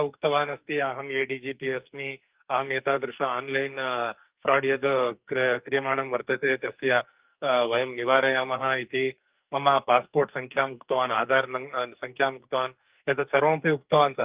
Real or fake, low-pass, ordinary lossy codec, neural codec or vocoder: fake; 3.6 kHz; Opus, 32 kbps; codec, 44.1 kHz, 2.6 kbps, SNAC